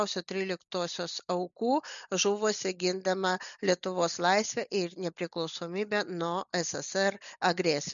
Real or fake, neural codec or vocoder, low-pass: real; none; 7.2 kHz